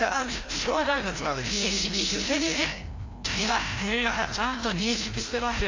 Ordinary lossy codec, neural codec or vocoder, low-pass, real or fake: AAC, 32 kbps; codec, 16 kHz, 0.5 kbps, FreqCodec, larger model; 7.2 kHz; fake